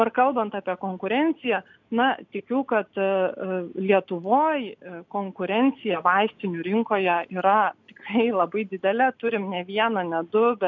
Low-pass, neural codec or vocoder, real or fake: 7.2 kHz; none; real